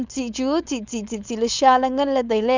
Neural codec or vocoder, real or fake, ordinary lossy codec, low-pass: codec, 16 kHz, 4.8 kbps, FACodec; fake; Opus, 64 kbps; 7.2 kHz